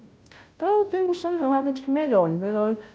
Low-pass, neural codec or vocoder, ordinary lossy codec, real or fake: none; codec, 16 kHz, 0.5 kbps, FunCodec, trained on Chinese and English, 25 frames a second; none; fake